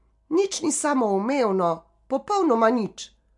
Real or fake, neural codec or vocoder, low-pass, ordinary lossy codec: real; none; 10.8 kHz; MP3, 64 kbps